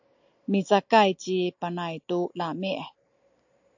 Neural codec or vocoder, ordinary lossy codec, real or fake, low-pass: none; AAC, 48 kbps; real; 7.2 kHz